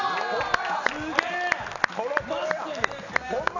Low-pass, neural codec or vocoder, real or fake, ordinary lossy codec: 7.2 kHz; none; real; none